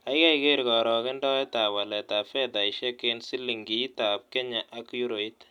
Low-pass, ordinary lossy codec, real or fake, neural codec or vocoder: 19.8 kHz; none; real; none